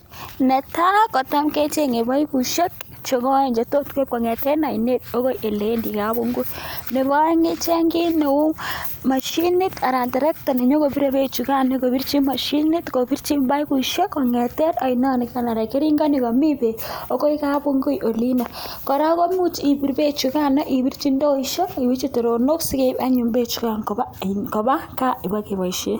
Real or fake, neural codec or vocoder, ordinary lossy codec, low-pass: real; none; none; none